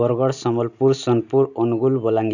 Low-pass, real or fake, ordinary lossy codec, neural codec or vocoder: 7.2 kHz; real; none; none